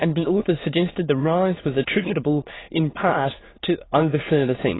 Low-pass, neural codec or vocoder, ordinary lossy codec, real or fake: 7.2 kHz; autoencoder, 22.05 kHz, a latent of 192 numbers a frame, VITS, trained on many speakers; AAC, 16 kbps; fake